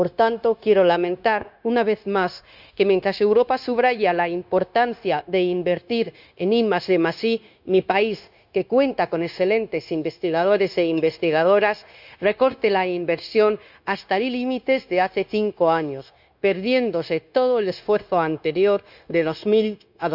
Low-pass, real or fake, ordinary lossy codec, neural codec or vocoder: 5.4 kHz; fake; none; codec, 16 kHz, 0.9 kbps, LongCat-Audio-Codec